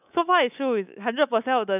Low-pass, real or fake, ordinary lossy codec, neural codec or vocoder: 3.6 kHz; fake; none; codec, 24 kHz, 3.1 kbps, DualCodec